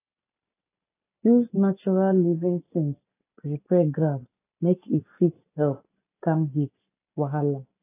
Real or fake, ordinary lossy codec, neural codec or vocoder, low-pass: real; AAC, 24 kbps; none; 3.6 kHz